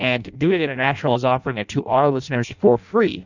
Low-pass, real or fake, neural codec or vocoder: 7.2 kHz; fake; codec, 16 kHz in and 24 kHz out, 0.6 kbps, FireRedTTS-2 codec